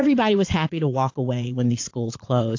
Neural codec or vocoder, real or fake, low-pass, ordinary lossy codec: vocoder, 22.05 kHz, 80 mel bands, Vocos; fake; 7.2 kHz; AAC, 48 kbps